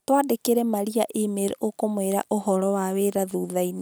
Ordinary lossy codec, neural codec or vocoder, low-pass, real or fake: none; none; none; real